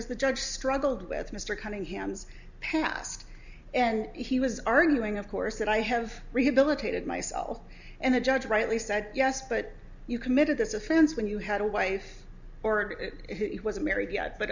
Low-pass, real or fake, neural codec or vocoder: 7.2 kHz; real; none